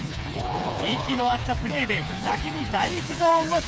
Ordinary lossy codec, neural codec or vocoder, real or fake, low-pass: none; codec, 16 kHz, 4 kbps, FreqCodec, smaller model; fake; none